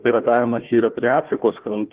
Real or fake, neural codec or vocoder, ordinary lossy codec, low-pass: fake; codec, 16 kHz, 1 kbps, FunCodec, trained on Chinese and English, 50 frames a second; Opus, 16 kbps; 3.6 kHz